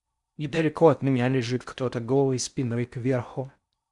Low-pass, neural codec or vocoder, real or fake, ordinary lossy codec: 10.8 kHz; codec, 16 kHz in and 24 kHz out, 0.6 kbps, FocalCodec, streaming, 4096 codes; fake; Opus, 64 kbps